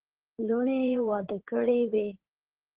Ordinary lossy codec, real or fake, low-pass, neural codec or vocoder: Opus, 16 kbps; fake; 3.6 kHz; codec, 16 kHz in and 24 kHz out, 2.2 kbps, FireRedTTS-2 codec